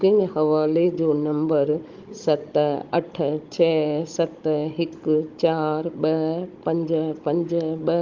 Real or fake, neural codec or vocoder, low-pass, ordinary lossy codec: fake; codec, 16 kHz, 16 kbps, FunCodec, trained on Chinese and English, 50 frames a second; 7.2 kHz; Opus, 32 kbps